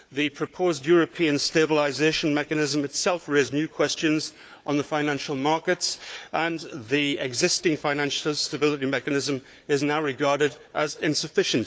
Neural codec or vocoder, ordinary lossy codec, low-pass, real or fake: codec, 16 kHz, 4 kbps, FunCodec, trained on Chinese and English, 50 frames a second; none; none; fake